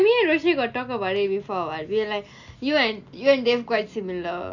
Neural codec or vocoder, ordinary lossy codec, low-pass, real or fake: none; none; 7.2 kHz; real